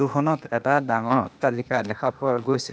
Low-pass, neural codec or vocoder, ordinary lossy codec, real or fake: none; codec, 16 kHz, 0.8 kbps, ZipCodec; none; fake